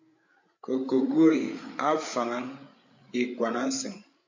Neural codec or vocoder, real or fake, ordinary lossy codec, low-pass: codec, 16 kHz, 8 kbps, FreqCodec, larger model; fake; MP3, 64 kbps; 7.2 kHz